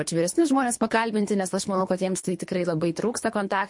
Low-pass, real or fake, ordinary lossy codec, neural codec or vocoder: 10.8 kHz; fake; MP3, 48 kbps; codec, 24 kHz, 3 kbps, HILCodec